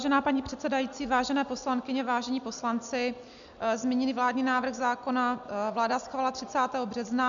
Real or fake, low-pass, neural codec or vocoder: real; 7.2 kHz; none